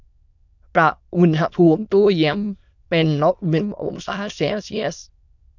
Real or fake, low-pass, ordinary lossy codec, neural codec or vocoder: fake; 7.2 kHz; none; autoencoder, 22.05 kHz, a latent of 192 numbers a frame, VITS, trained on many speakers